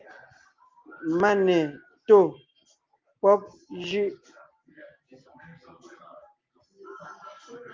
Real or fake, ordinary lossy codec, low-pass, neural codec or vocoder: real; Opus, 24 kbps; 7.2 kHz; none